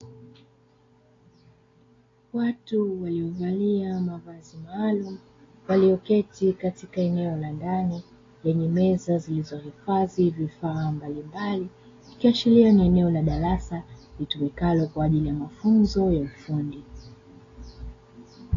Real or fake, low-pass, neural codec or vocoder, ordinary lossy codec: real; 7.2 kHz; none; AAC, 32 kbps